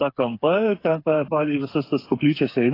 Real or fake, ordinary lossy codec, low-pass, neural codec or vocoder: fake; AAC, 32 kbps; 5.4 kHz; codec, 16 kHz, 8 kbps, FreqCodec, smaller model